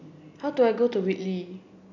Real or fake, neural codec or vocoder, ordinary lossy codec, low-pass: real; none; none; 7.2 kHz